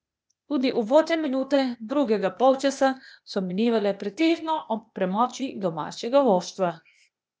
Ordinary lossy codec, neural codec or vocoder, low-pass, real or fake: none; codec, 16 kHz, 0.8 kbps, ZipCodec; none; fake